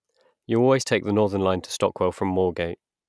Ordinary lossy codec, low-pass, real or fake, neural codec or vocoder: none; none; real; none